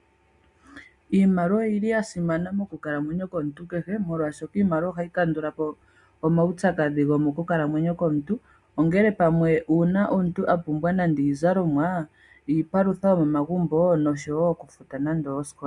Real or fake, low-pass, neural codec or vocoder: real; 10.8 kHz; none